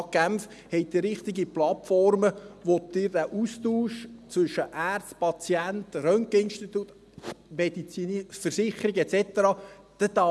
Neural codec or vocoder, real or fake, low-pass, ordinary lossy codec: none; real; none; none